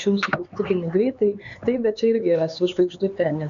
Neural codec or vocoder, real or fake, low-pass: codec, 16 kHz, 4 kbps, X-Codec, HuBERT features, trained on LibriSpeech; fake; 7.2 kHz